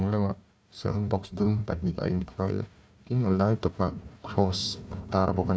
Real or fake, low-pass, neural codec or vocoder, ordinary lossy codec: fake; none; codec, 16 kHz, 1 kbps, FunCodec, trained on Chinese and English, 50 frames a second; none